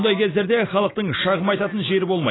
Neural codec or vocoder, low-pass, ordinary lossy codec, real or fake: none; 7.2 kHz; AAC, 16 kbps; real